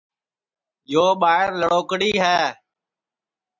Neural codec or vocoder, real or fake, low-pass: none; real; 7.2 kHz